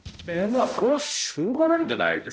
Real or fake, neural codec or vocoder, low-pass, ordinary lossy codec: fake; codec, 16 kHz, 0.5 kbps, X-Codec, HuBERT features, trained on balanced general audio; none; none